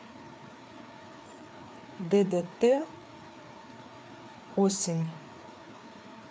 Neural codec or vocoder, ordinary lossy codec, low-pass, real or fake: codec, 16 kHz, 4 kbps, FreqCodec, larger model; none; none; fake